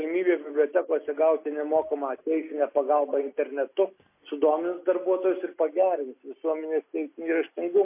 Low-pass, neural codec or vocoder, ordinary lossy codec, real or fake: 3.6 kHz; none; MP3, 24 kbps; real